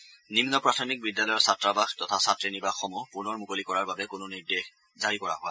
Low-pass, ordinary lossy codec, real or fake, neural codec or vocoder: none; none; real; none